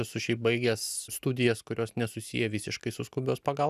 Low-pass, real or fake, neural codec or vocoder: 14.4 kHz; real; none